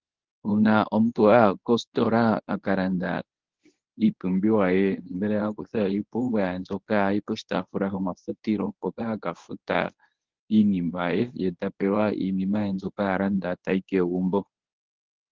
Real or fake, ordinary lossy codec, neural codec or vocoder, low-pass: fake; Opus, 16 kbps; codec, 24 kHz, 0.9 kbps, WavTokenizer, medium speech release version 1; 7.2 kHz